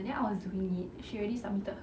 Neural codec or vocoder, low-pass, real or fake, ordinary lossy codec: none; none; real; none